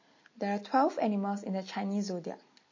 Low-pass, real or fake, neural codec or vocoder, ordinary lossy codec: 7.2 kHz; real; none; MP3, 32 kbps